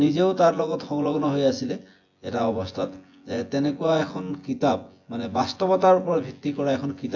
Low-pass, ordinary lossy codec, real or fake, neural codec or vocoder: 7.2 kHz; AAC, 48 kbps; fake; vocoder, 24 kHz, 100 mel bands, Vocos